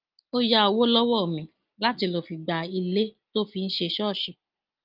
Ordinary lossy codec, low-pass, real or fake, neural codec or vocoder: Opus, 24 kbps; 5.4 kHz; real; none